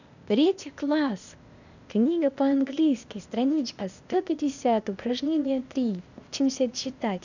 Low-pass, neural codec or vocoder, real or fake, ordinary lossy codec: 7.2 kHz; codec, 16 kHz, 0.8 kbps, ZipCodec; fake; none